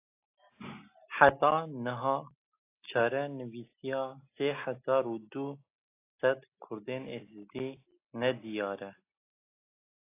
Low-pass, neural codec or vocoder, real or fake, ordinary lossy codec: 3.6 kHz; none; real; AAC, 24 kbps